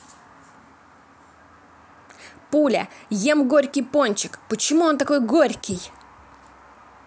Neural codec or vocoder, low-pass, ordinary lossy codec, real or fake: none; none; none; real